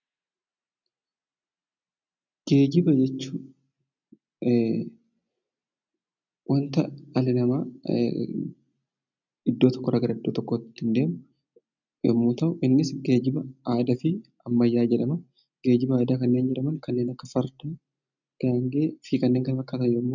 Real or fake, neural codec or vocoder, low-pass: real; none; 7.2 kHz